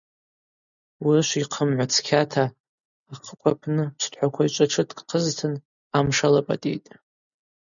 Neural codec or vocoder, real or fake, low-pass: none; real; 7.2 kHz